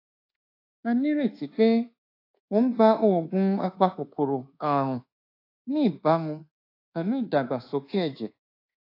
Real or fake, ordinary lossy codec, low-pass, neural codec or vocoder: fake; AAC, 32 kbps; 5.4 kHz; codec, 24 kHz, 1.2 kbps, DualCodec